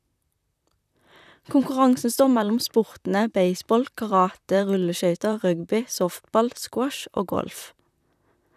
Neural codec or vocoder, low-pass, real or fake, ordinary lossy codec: vocoder, 44.1 kHz, 128 mel bands, Pupu-Vocoder; 14.4 kHz; fake; none